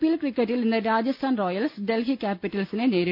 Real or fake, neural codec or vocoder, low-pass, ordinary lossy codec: real; none; 5.4 kHz; none